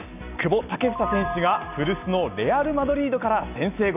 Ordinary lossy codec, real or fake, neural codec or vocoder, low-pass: none; real; none; 3.6 kHz